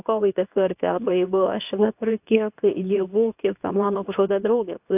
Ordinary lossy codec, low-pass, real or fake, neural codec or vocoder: AAC, 32 kbps; 3.6 kHz; fake; codec, 24 kHz, 0.9 kbps, WavTokenizer, medium speech release version 2